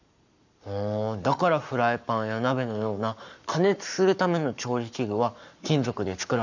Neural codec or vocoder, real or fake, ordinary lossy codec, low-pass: codec, 44.1 kHz, 7.8 kbps, Pupu-Codec; fake; none; 7.2 kHz